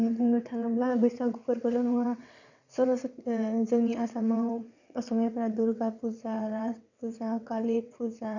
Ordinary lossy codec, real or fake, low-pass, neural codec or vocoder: none; fake; 7.2 kHz; vocoder, 22.05 kHz, 80 mel bands, WaveNeXt